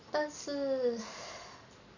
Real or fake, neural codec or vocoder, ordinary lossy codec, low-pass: real; none; none; 7.2 kHz